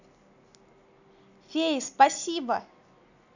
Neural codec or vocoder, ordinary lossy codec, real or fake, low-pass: none; none; real; 7.2 kHz